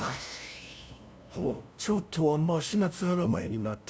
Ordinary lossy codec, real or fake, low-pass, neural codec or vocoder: none; fake; none; codec, 16 kHz, 0.5 kbps, FunCodec, trained on LibriTTS, 25 frames a second